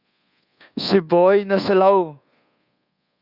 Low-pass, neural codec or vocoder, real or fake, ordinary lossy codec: 5.4 kHz; codec, 24 kHz, 1.2 kbps, DualCodec; fake; Opus, 64 kbps